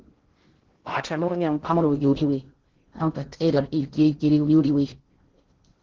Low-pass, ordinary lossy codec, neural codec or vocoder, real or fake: 7.2 kHz; Opus, 16 kbps; codec, 16 kHz in and 24 kHz out, 0.6 kbps, FocalCodec, streaming, 2048 codes; fake